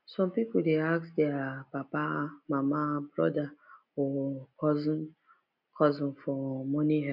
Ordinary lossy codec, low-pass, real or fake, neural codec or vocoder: none; 5.4 kHz; real; none